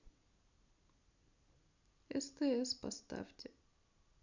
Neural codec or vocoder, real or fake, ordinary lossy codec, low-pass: none; real; none; 7.2 kHz